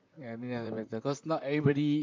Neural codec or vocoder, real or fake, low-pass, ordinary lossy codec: vocoder, 44.1 kHz, 128 mel bands, Pupu-Vocoder; fake; 7.2 kHz; MP3, 64 kbps